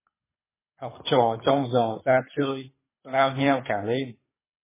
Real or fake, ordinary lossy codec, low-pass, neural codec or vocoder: fake; MP3, 16 kbps; 3.6 kHz; codec, 24 kHz, 3 kbps, HILCodec